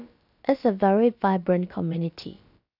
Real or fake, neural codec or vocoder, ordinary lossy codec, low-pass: fake; codec, 16 kHz, about 1 kbps, DyCAST, with the encoder's durations; none; 5.4 kHz